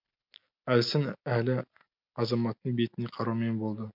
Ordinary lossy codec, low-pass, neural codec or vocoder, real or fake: MP3, 48 kbps; 5.4 kHz; none; real